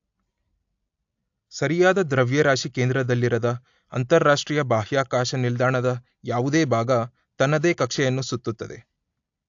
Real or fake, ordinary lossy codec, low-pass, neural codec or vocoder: real; AAC, 64 kbps; 7.2 kHz; none